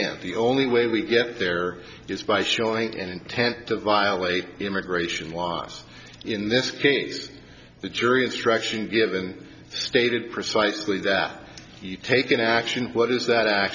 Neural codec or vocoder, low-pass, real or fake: none; 7.2 kHz; real